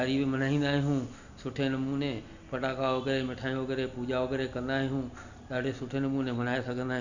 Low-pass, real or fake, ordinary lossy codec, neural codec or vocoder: 7.2 kHz; real; none; none